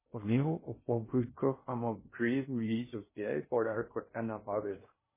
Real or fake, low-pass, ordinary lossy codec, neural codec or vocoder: fake; 3.6 kHz; MP3, 16 kbps; codec, 16 kHz in and 24 kHz out, 0.6 kbps, FocalCodec, streaming, 2048 codes